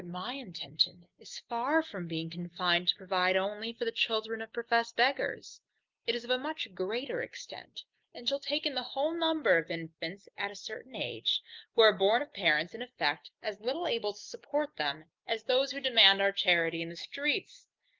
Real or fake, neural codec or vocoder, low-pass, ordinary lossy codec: real; none; 7.2 kHz; Opus, 32 kbps